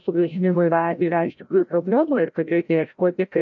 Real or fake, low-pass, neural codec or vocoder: fake; 7.2 kHz; codec, 16 kHz, 0.5 kbps, FreqCodec, larger model